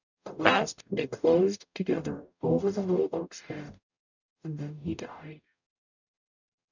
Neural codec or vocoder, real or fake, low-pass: codec, 44.1 kHz, 0.9 kbps, DAC; fake; 7.2 kHz